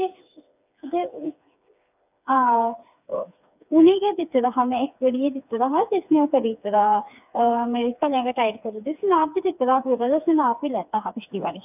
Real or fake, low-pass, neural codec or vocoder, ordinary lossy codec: fake; 3.6 kHz; codec, 16 kHz, 4 kbps, FreqCodec, smaller model; none